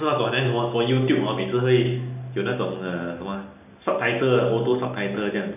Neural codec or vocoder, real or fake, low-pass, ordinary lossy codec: none; real; 3.6 kHz; none